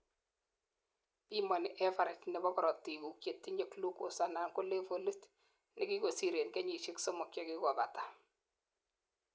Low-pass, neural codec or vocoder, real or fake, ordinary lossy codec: none; none; real; none